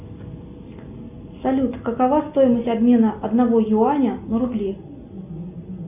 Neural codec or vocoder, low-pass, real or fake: none; 3.6 kHz; real